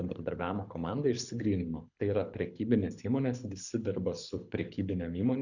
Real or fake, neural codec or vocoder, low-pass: fake; codec, 24 kHz, 6 kbps, HILCodec; 7.2 kHz